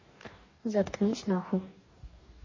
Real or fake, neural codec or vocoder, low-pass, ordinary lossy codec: fake; codec, 44.1 kHz, 2.6 kbps, DAC; 7.2 kHz; MP3, 32 kbps